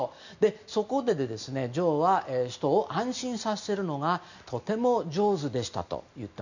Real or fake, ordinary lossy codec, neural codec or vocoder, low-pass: real; none; none; 7.2 kHz